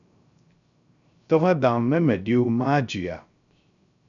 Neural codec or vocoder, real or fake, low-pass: codec, 16 kHz, 0.3 kbps, FocalCodec; fake; 7.2 kHz